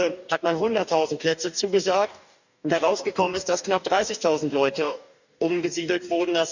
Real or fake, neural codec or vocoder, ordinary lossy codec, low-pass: fake; codec, 44.1 kHz, 2.6 kbps, DAC; none; 7.2 kHz